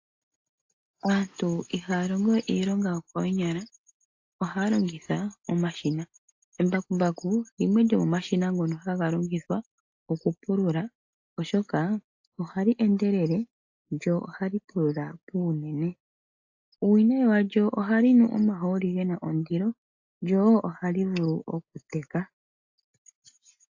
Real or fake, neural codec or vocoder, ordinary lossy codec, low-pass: real; none; AAC, 48 kbps; 7.2 kHz